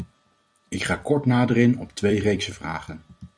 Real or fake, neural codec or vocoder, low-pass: fake; vocoder, 44.1 kHz, 128 mel bands every 256 samples, BigVGAN v2; 9.9 kHz